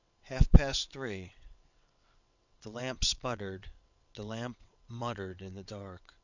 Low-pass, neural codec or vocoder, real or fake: 7.2 kHz; vocoder, 22.05 kHz, 80 mel bands, WaveNeXt; fake